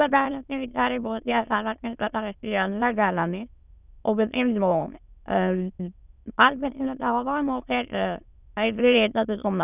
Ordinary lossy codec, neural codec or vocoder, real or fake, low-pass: none; autoencoder, 22.05 kHz, a latent of 192 numbers a frame, VITS, trained on many speakers; fake; 3.6 kHz